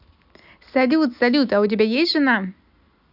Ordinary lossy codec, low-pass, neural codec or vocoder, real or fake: none; 5.4 kHz; none; real